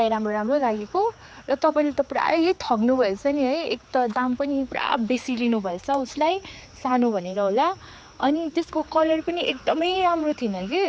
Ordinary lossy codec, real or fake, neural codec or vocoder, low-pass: none; fake; codec, 16 kHz, 4 kbps, X-Codec, HuBERT features, trained on general audio; none